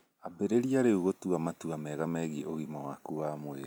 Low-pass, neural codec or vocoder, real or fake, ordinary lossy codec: none; none; real; none